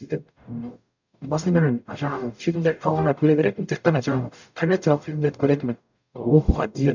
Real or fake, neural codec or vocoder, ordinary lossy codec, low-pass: fake; codec, 44.1 kHz, 0.9 kbps, DAC; none; 7.2 kHz